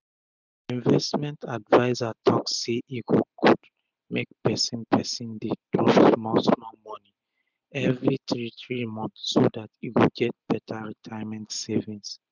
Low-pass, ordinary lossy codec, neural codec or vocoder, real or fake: 7.2 kHz; none; none; real